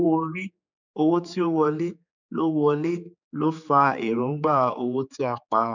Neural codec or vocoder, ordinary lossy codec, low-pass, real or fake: codec, 16 kHz, 4 kbps, X-Codec, HuBERT features, trained on general audio; none; 7.2 kHz; fake